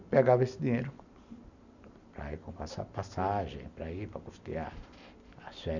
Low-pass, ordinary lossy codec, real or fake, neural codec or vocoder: 7.2 kHz; none; real; none